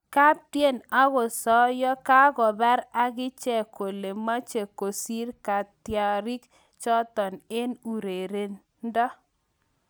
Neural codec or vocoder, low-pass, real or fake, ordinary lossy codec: none; none; real; none